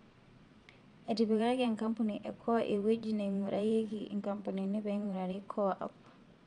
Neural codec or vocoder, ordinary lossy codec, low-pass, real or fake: vocoder, 22.05 kHz, 80 mel bands, Vocos; none; 9.9 kHz; fake